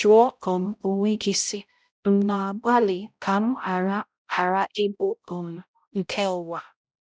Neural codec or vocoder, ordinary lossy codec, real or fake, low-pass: codec, 16 kHz, 0.5 kbps, X-Codec, HuBERT features, trained on balanced general audio; none; fake; none